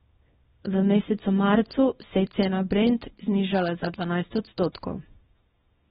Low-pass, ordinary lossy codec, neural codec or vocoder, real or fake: 10.8 kHz; AAC, 16 kbps; codec, 24 kHz, 0.9 kbps, WavTokenizer, small release; fake